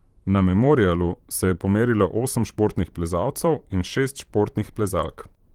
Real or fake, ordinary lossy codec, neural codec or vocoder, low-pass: fake; Opus, 24 kbps; vocoder, 44.1 kHz, 128 mel bands, Pupu-Vocoder; 19.8 kHz